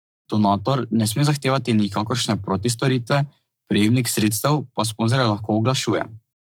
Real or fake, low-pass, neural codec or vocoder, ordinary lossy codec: fake; none; codec, 44.1 kHz, 7.8 kbps, Pupu-Codec; none